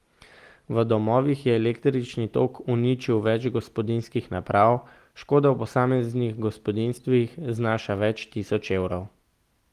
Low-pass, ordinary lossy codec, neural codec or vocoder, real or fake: 14.4 kHz; Opus, 24 kbps; none; real